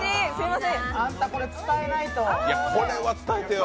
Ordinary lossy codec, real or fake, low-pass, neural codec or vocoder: none; real; none; none